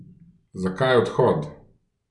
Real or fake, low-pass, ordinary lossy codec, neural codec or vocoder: real; 10.8 kHz; none; none